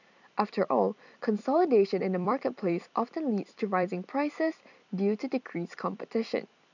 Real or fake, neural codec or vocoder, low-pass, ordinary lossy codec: fake; vocoder, 44.1 kHz, 128 mel bands every 256 samples, BigVGAN v2; 7.2 kHz; none